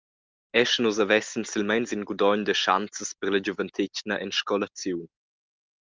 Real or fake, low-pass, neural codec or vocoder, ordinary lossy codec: real; 7.2 kHz; none; Opus, 24 kbps